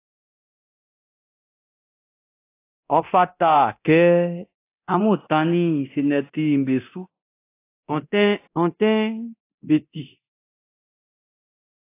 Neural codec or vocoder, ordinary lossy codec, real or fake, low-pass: codec, 24 kHz, 0.9 kbps, DualCodec; AAC, 24 kbps; fake; 3.6 kHz